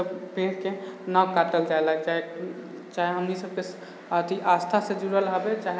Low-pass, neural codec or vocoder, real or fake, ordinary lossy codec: none; none; real; none